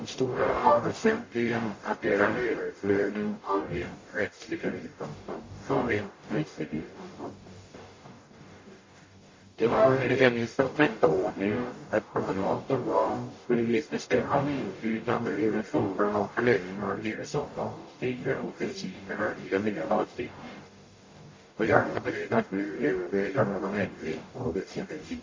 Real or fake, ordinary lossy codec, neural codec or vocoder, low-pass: fake; MP3, 32 kbps; codec, 44.1 kHz, 0.9 kbps, DAC; 7.2 kHz